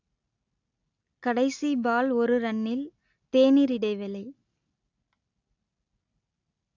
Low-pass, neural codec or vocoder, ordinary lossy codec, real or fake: 7.2 kHz; none; AAC, 48 kbps; real